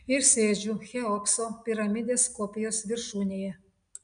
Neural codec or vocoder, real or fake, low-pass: none; real; 9.9 kHz